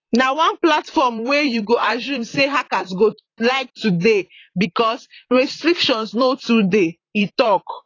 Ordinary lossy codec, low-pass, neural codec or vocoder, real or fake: AAC, 32 kbps; 7.2 kHz; vocoder, 44.1 kHz, 128 mel bands, Pupu-Vocoder; fake